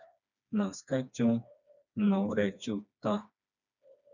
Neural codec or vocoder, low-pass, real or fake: codec, 16 kHz, 2 kbps, FreqCodec, smaller model; 7.2 kHz; fake